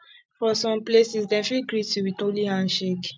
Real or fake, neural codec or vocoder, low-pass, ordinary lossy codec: real; none; none; none